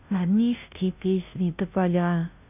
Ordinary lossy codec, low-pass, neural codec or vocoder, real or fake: none; 3.6 kHz; codec, 16 kHz, 0.5 kbps, FunCodec, trained on Chinese and English, 25 frames a second; fake